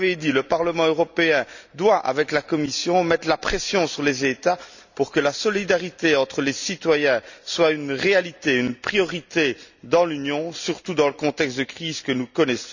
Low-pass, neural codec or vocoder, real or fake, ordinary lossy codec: 7.2 kHz; none; real; none